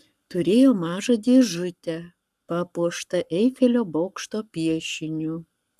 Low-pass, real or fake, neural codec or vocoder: 14.4 kHz; fake; codec, 44.1 kHz, 7.8 kbps, Pupu-Codec